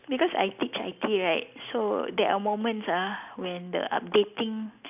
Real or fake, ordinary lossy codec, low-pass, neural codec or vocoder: real; none; 3.6 kHz; none